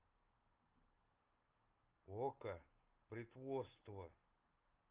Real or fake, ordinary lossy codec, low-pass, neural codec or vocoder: real; Opus, 24 kbps; 3.6 kHz; none